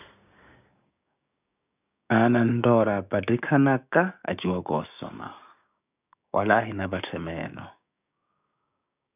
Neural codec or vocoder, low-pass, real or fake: codec, 16 kHz, 6 kbps, DAC; 3.6 kHz; fake